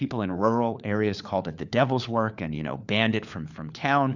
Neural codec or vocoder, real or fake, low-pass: codec, 16 kHz, 4 kbps, FunCodec, trained on LibriTTS, 50 frames a second; fake; 7.2 kHz